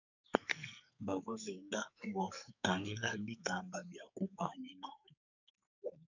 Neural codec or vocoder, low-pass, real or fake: codec, 44.1 kHz, 2.6 kbps, SNAC; 7.2 kHz; fake